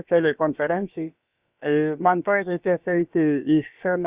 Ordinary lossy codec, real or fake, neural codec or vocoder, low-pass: Opus, 64 kbps; fake; codec, 16 kHz, about 1 kbps, DyCAST, with the encoder's durations; 3.6 kHz